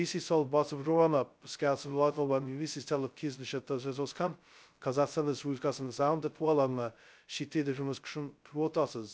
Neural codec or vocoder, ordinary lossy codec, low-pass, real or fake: codec, 16 kHz, 0.2 kbps, FocalCodec; none; none; fake